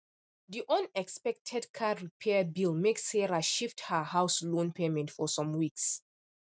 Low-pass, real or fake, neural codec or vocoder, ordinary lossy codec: none; real; none; none